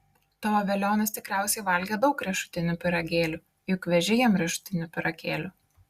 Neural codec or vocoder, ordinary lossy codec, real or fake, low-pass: none; Opus, 64 kbps; real; 14.4 kHz